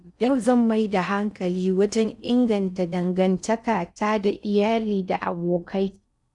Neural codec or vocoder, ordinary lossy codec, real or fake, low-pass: codec, 16 kHz in and 24 kHz out, 0.6 kbps, FocalCodec, streaming, 4096 codes; none; fake; 10.8 kHz